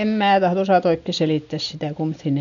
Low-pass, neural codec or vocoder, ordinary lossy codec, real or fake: 7.2 kHz; none; none; real